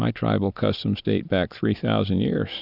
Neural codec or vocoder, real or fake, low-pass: none; real; 5.4 kHz